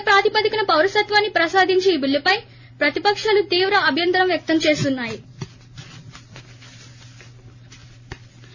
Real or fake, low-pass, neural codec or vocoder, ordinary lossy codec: real; 7.2 kHz; none; none